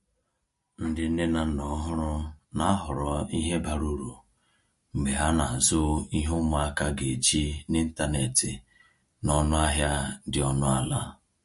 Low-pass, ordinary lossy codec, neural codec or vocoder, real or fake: 14.4 kHz; MP3, 48 kbps; none; real